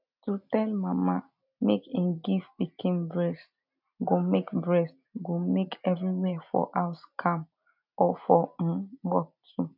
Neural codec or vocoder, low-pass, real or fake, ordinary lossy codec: none; 5.4 kHz; real; none